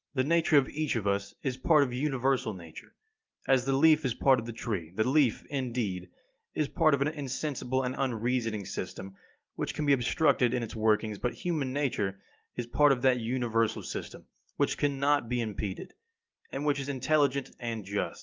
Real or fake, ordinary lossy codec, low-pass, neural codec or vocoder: real; Opus, 24 kbps; 7.2 kHz; none